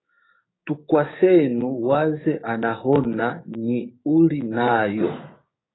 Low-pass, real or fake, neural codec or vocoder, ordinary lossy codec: 7.2 kHz; fake; codec, 16 kHz, 6 kbps, DAC; AAC, 16 kbps